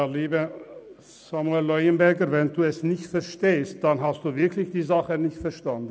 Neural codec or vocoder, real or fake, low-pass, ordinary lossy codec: none; real; none; none